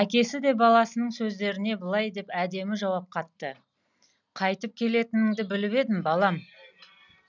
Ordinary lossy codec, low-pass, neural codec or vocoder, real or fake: none; 7.2 kHz; none; real